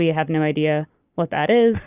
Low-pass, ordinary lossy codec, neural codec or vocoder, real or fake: 3.6 kHz; Opus, 64 kbps; none; real